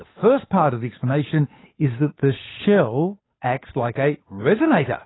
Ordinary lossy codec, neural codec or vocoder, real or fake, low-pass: AAC, 16 kbps; codec, 16 kHz, 8 kbps, FunCodec, trained on Chinese and English, 25 frames a second; fake; 7.2 kHz